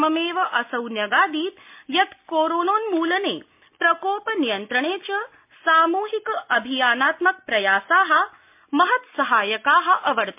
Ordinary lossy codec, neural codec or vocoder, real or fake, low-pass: MP3, 32 kbps; none; real; 3.6 kHz